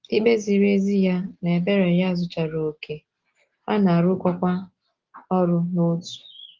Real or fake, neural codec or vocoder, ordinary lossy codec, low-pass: real; none; Opus, 16 kbps; 7.2 kHz